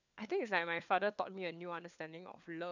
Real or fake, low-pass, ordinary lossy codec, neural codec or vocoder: fake; 7.2 kHz; none; codec, 16 kHz, 4 kbps, FunCodec, trained on LibriTTS, 50 frames a second